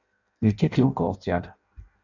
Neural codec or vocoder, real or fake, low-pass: codec, 16 kHz in and 24 kHz out, 0.6 kbps, FireRedTTS-2 codec; fake; 7.2 kHz